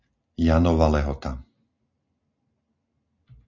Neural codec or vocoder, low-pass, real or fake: none; 7.2 kHz; real